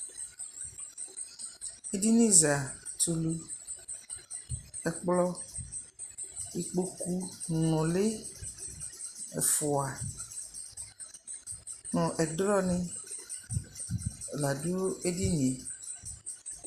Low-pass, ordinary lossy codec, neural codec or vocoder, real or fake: 14.4 kHz; Opus, 64 kbps; none; real